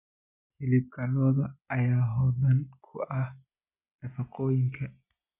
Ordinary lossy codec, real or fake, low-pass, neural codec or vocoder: none; real; 3.6 kHz; none